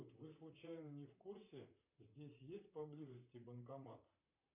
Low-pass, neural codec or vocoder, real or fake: 3.6 kHz; vocoder, 44.1 kHz, 128 mel bands, Pupu-Vocoder; fake